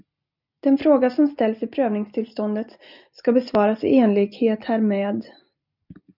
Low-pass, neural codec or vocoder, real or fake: 5.4 kHz; none; real